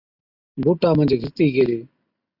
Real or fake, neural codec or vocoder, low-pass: real; none; 5.4 kHz